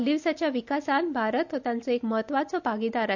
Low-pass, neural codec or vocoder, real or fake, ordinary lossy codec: 7.2 kHz; none; real; none